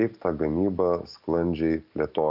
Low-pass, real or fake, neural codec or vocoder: 5.4 kHz; real; none